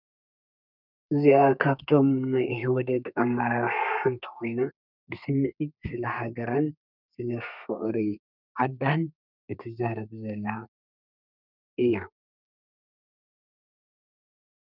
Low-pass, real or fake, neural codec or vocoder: 5.4 kHz; fake; codec, 32 kHz, 1.9 kbps, SNAC